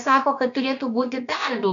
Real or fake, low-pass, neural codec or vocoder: fake; 7.2 kHz; codec, 16 kHz, about 1 kbps, DyCAST, with the encoder's durations